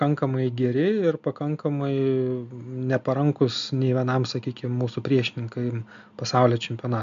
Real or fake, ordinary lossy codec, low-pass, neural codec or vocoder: real; MP3, 64 kbps; 7.2 kHz; none